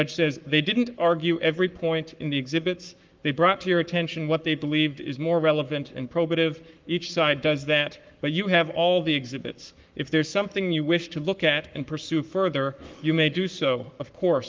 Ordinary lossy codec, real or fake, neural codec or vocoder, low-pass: Opus, 24 kbps; fake; codec, 44.1 kHz, 7.8 kbps, Pupu-Codec; 7.2 kHz